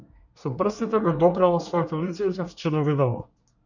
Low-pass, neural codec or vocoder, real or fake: 7.2 kHz; codec, 24 kHz, 1 kbps, SNAC; fake